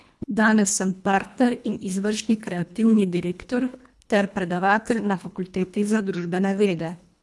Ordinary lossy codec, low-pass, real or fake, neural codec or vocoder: none; none; fake; codec, 24 kHz, 1.5 kbps, HILCodec